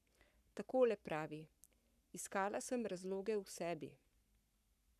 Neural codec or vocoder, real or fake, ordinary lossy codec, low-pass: codec, 44.1 kHz, 7.8 kbps, Pupu-Codec; fake; none; 14.4 kHz